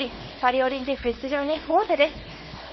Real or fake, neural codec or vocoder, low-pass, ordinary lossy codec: fake; codec, 24 kHz, 0.9 kbps, WavTokenizer, small release; 7.2 kHz; MP3, 24 kbps